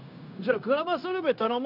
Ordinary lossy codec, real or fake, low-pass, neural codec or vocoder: none; fake; 5.4 kHz; codec, 16 kHz, 0.9 kbps, LongCat-Audio-Codec